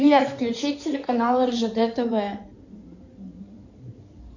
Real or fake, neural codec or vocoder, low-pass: fake; codec, 16 kHz in and 24 kHz out, 2.2 kbps, FireRedTTS-2 codec; 7.2 kHz